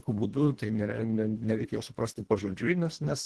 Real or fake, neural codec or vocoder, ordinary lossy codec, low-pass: fake; codec, 24 kHz, 1.5 kbps, HILCodec; Opus, 16 kbps; 10.8 kHz